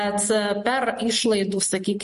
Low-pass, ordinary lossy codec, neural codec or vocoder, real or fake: 14.4 kHz; MP3, 48 kbps; none; real